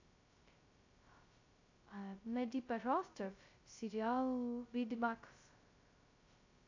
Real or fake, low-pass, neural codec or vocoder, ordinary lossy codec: fake; 7.2 kHz; codec, 16 kHz, 0.2 kbps, FocalCodec; AAC, 48 kbps